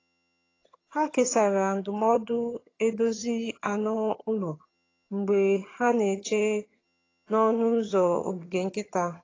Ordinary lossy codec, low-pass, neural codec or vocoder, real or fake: AAC, 32 kbps; 7.2 kHz; vocoder, 22.05 kHz, 80 mel bands, HiFi-GAN; fake